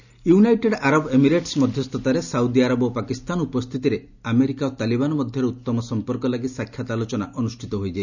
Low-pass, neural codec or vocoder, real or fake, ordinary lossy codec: 7.2 kHz; none; real; none